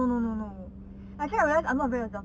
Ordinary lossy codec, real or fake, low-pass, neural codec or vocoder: none; real; none; none